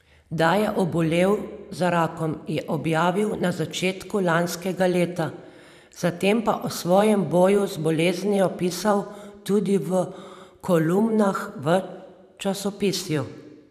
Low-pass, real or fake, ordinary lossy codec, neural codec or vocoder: 14.4 kHz; fake; none; vocoder, 44.1 kHz, 128 mel bands every 512 samples, BigVGAN v2